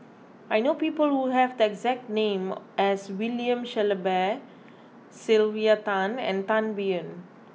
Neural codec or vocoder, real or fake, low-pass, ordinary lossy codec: none; real; none; none